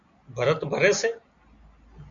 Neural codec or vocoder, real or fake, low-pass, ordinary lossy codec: none; real; 7.2 kHz; MP3, 96 kbps